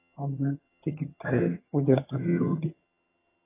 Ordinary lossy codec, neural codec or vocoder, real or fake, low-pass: AAC, 16 kbps; vocoder, 22.05 kHz, 80 mel bands, HiFi-GAN; fake; 3.6 kHz